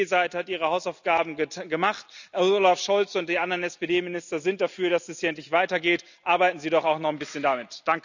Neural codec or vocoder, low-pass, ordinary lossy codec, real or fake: none; 7.2 kHz; none; real